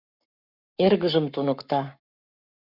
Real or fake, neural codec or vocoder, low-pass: fake; codec, 44.1 kHz, 7.8 kbps, Pupu-Codec; 5.4 kHz